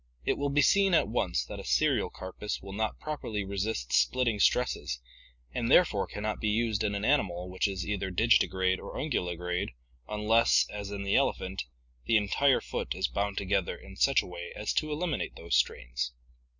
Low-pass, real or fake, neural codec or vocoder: 7.2 kHz; real; none